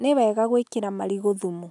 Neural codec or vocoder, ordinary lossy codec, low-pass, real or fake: none; none; 10.8 kHz; real